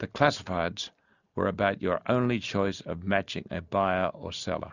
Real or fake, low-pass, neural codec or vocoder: real; 7.2 kHz; none